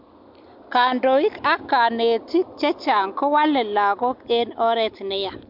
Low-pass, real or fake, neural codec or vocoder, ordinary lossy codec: 5.4 kHz; real; none; MP3, 48 kbps